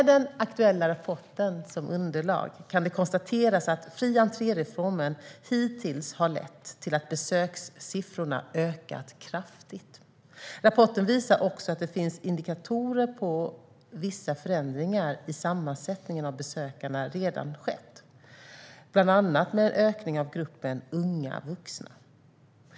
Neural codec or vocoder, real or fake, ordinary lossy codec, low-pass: none; real; none; none